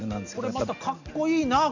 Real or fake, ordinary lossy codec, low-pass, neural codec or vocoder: real; none; 7.2 kHz; none